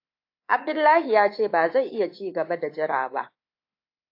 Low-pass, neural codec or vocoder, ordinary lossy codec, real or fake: 5.4 kHz; codec, 24 kHz, 3.1 kbps, DualCodec; AAC, 32 kbps; fake